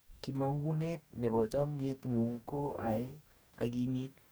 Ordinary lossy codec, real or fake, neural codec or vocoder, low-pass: none; fake; codec, 44.1 kHz, 2.6 kbps, DAC; none